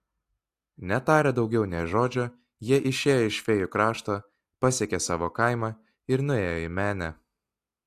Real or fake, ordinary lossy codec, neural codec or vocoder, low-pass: real; AAC, 64 kbps; none; 14.4 kHz